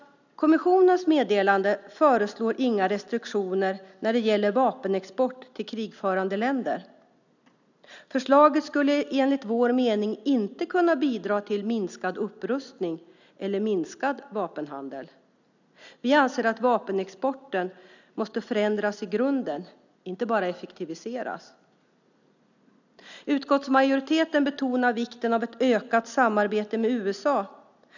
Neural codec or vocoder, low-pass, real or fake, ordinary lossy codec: none; 7.2 kHz; real; none